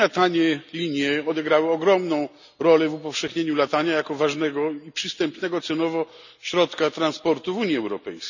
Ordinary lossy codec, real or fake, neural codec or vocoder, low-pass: none; real; none; 7.2 kHz